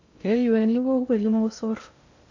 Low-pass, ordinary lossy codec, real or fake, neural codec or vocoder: 7.2 kHz; AAC, 48 kbps; fake; codec, 16 kHz in and 24 kHz out, 0.6 kbps, FocalCodec, streaming, 2048 codes